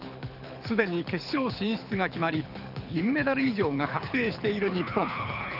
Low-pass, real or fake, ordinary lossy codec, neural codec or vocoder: 5.4 kHz; fake; none; codec, 24 kHz, 6 kbps, HILCodec